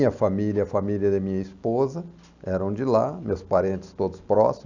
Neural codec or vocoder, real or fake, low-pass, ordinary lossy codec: none; real; 7.2 kHz; none